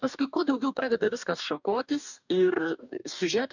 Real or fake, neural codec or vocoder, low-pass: fake; codec, 44.1 kHz, 2.6 kbps, DAC; 7.2 kHz